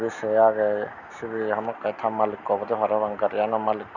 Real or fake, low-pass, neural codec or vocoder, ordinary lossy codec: real; 7.2 kHz; none; none